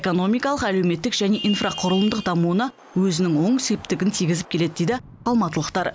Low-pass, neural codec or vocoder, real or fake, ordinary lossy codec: none; none; real; none